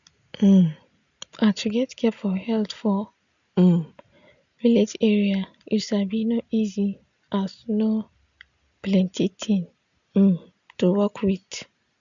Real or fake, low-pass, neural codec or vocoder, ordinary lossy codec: real; 7.2 kHz; none; none